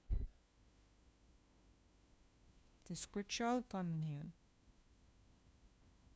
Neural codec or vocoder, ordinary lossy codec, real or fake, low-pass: codec, 16 kHz, 1 kbps, FunCodec, trained on LibriTTS, 50 frames a second; none; fake; none